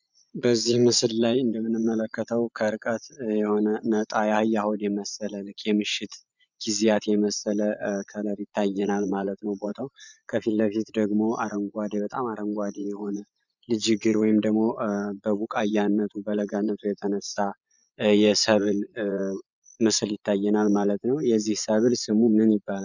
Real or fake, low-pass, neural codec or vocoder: fake; 7.2 kHz; vocoder, 24 kHz, 100 mel bands, Vocos